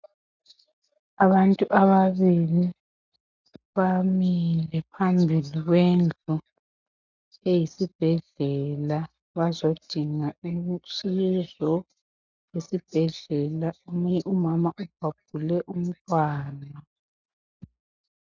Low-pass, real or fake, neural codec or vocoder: 7.2 kHz; real; none